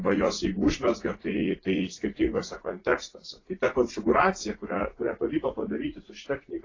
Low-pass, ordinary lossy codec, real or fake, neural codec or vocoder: 7.2 kHz; AAC, 32 kbps; fake; vocoder, 44.1 kHz, 80 mel bands, Vocos